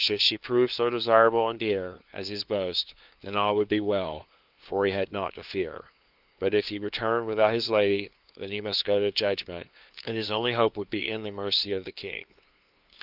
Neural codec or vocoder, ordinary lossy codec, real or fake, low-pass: codec, 16 kHz, 2 kbps, FunCodec, trained on LibriTTS, 25 frames a second; Opus, 32 kbps; fake; 5.4 kHz